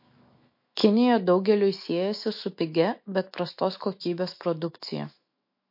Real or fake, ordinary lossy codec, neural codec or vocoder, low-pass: fake; MP3, 32 kbps; autoencoder, 48 kHz, 128 numbers a frame, DAC-VAE, trained on Japanese speech; 5.4 kHz